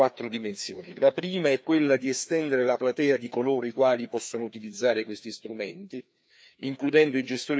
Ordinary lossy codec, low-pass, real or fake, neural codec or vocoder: none; none; fake; codec, 16 kHz, 2 kbps, FreqCodec, larger model